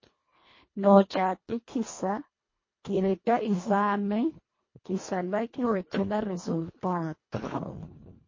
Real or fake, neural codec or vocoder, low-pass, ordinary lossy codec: fake; codec, 24 kHz, 1.5 kbps, HILCodec; 7.2 kHz; MP3, 32 kbps